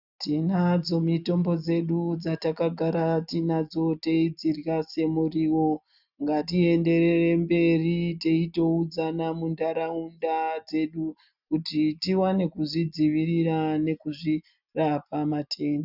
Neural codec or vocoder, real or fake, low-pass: none; real; 5.4 kHz